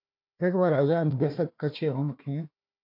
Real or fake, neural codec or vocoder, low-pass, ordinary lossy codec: fake; codec, 16 kHz, 1 kbps, FunCodec, trained on Chinese and English, 50 frames a second; 5.4 kHz; MP3, 48 kbps